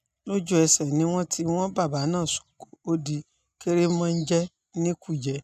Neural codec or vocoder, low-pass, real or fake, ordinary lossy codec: none; 14.4 kHz; real; none